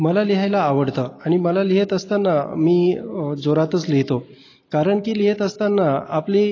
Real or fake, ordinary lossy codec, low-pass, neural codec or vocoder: real; AAC, 32 kbps; 7.2 kHz; none